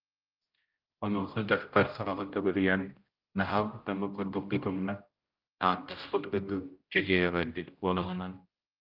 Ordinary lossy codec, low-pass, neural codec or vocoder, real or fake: Opus, 24 kbps; 5.4 kHz; codec, 16 kHz, 0.5 kbps, X-Codec, HuBERT features, trained on general audio; fake